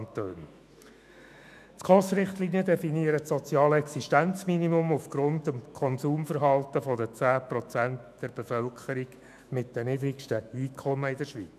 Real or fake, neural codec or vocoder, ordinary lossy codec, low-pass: fake; autoencoder, 48 kHz, 128 numbers a frame, DAC-VAE, trained on Japanese speech; none; 14.4 kHz